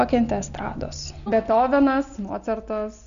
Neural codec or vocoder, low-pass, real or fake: none; 7.2 kHz; real